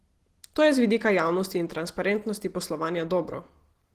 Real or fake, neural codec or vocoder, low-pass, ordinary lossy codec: real; none; 14.4 kHz; Opus, 16 kbps